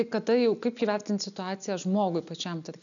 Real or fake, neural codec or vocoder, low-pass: real; none; 7.2 kHz